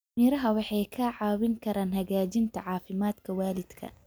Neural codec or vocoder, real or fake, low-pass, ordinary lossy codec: none; real; none; none